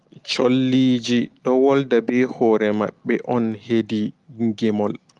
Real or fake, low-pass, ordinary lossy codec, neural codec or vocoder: real; 10.8 kHz; Opus, 24 kbps; none